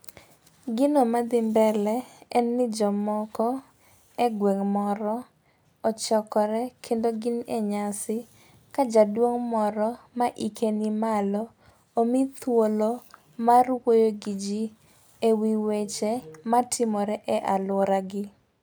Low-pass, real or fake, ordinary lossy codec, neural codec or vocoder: none; real; none; none